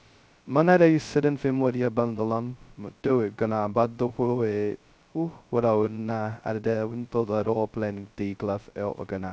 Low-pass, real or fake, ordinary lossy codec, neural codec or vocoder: none; fake; none; codec, 16 kHz, 0.2 kbps, FocalCodec